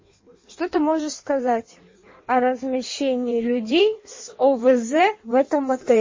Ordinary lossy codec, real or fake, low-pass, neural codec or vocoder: MP3, 32 kbps; fake; 7.2 kHz; codec, 16 kHz in and 24 kHz out, 1.1 kbps, FireRedTTS-2 codec